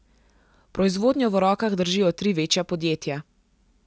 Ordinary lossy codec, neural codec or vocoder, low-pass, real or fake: none; none; none; real